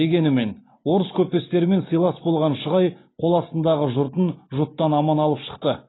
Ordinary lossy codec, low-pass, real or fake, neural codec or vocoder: AAC, 16 kbps; 7.2 kHz; real; none